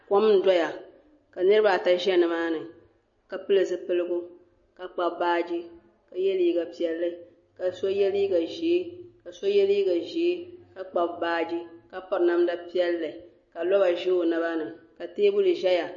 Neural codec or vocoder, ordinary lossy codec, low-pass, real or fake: none; MP3, 32 kbps; 7.2 kHz; real